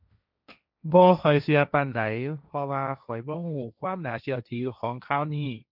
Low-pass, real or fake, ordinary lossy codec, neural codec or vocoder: 5.4 kHz; fake; none; codec, 16 kHz, 1.1 kbps, Voila-Tokenizer